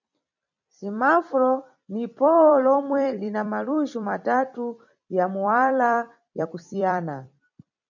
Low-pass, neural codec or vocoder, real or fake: 7.2 kHz; vocoder, 44.1 kHz, 128 mel bands every 256 samples, BigVGAN v2; fake